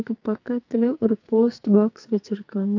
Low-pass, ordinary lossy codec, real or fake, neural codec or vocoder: 7.2 kHz; AAC, 48 kbps; fake; codec, 44.1 kHz, 2.6 kbps, SNAC